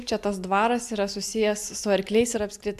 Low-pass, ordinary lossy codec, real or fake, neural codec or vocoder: 14.4 kHz; AAC, 96 kbps; real; none